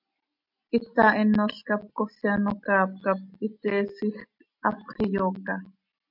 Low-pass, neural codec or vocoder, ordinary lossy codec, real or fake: 5.4 kHz; none; MP3, 48 kbps; real